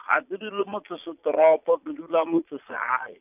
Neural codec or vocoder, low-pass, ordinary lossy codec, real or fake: vocoder, 22.05 kHz, 80 mel bands, Vocos; 3.6 kHz; none; fake